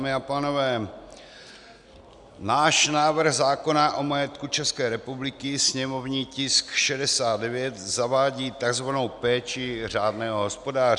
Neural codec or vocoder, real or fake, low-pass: none; real; 10.8 kHz